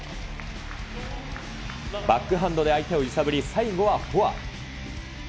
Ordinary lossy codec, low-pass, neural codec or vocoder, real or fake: none; none; none; real